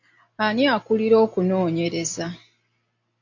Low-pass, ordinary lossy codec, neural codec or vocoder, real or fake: 7.2 kHz; AAC, 48 kbps; none; real